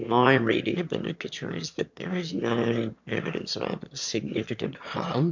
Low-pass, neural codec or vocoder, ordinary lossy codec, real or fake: 7.2 kHz; autoencoder, 22.05 kHz, a latent of 192 numbers a frame, VITS, trained on one speaker; MP3, 64 kbps; fake